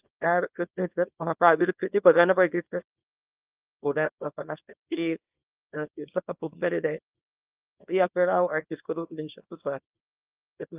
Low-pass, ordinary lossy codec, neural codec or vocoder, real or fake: 3.6 kHz; Opus, 32 kbps; codec, 24 kHz, 0.9 kbps, WavTokenizer, small release; fake